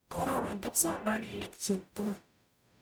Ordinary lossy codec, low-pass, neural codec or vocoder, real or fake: none; none; codec, 44.1 kHz, 0.9 kbps, DAC; fake